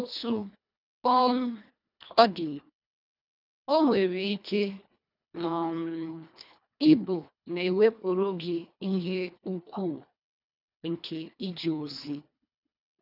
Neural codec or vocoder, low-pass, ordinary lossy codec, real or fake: codec, 24 kHz, 1.5 kbps, HILCodec; 5.4 kHz; none; fake